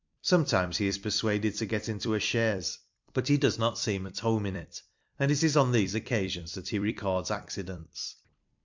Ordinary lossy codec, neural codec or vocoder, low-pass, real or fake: MP3, 64 kbps; vocoder, 44.1 kHz, 128 mel bands every 256 samples, BigVGAN v2; 7.2 kHz; fake